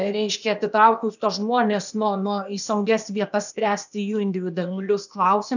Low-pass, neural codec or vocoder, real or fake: 7.2 kHz; codec, 16 kHz, 0.8 kbps, ZipCodec; fake